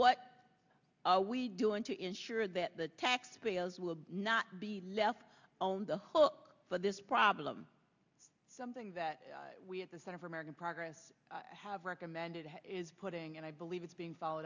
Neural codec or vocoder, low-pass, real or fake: none; 7.2 kHz; real